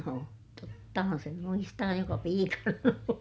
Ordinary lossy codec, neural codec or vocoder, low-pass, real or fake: none; none; none; real